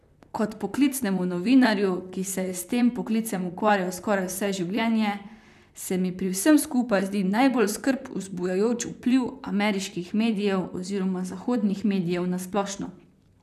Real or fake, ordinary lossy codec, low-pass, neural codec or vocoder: fake; none; 14.4 kHz; vocoder, 44.1 kHz, 128 mel bands, Pupu-Vocoder